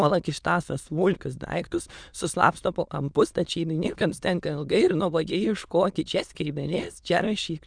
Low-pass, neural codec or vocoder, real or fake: 9.9 kHz; autoencoder, 22.05 kHz, a latent of 192 numbers a frame, VITS, trained on many speakers; fake